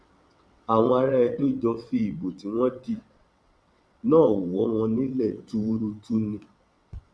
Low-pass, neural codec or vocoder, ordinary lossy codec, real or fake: none; vocoder, 22.05 kHz, 80 mel bands, WaveNeXt; none; fake